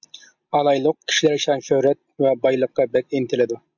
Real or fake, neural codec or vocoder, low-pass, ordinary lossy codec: real; none; 7.2 kHz; MP3, 64 kbps